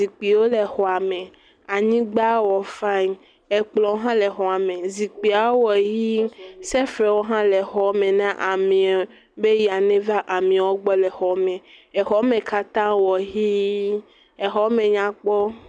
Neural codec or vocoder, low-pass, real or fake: none; 9.9 kHz; real